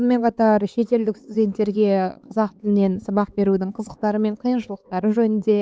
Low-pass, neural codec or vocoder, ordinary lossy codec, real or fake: none; codec, 16 kHz, 4 kbps, X-Codec, HuBERT features, trained on LibriSpeech; none; fake